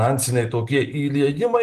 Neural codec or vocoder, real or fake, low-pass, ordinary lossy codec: none; real; 14.4 kHz; Opus, 24 kbps